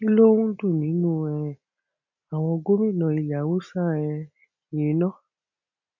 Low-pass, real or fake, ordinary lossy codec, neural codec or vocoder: 7.2 kHz; real; none; none